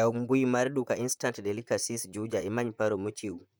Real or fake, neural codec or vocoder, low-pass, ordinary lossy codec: fake; vocoder, 44.1 kHz, 128 mel bands, Pupu-Vocoder; none; none